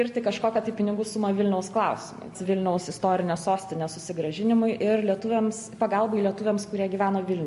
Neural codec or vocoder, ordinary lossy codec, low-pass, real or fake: none; MP3, 48 kbps; 14.4 kHz; real